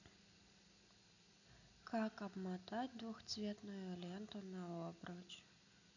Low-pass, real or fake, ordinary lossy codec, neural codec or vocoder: 7.2 kHz; real; none; none